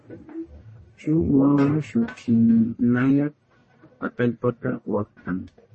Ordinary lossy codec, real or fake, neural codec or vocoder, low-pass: MP3, 32 kbps; fake; codec, 44.1 kHz, 1.7 kbps, Pupu-Codec; 10.8 kHz